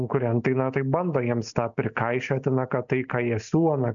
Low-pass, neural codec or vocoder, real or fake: 7.2 kHz; none; real